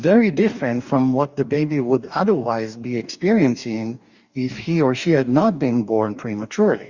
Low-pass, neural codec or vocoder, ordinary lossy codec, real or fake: 7.2 kHz; codec, 44.1 kHz, 2.6 kbps, DAC; Opus, 64 kbps; fake